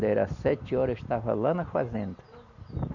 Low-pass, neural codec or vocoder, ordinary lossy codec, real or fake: 7.2 kHz; none; none; real